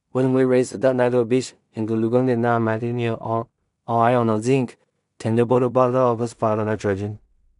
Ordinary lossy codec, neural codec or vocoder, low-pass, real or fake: none; codec, 16 kHz in and 24 kHz out, 0.4 kbps, LongCat-Audio-Codec, two codebook decoder; 10.8 kHz; fake